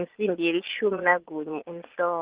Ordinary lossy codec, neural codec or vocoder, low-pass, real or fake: Opus, 16 kbps; vocoder, 22.05 kHz, 80 mel bands, Vocos; 3.6 kHz; fake